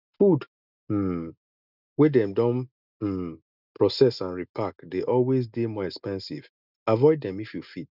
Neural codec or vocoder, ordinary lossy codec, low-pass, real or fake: none; none; 5.4 kHz; real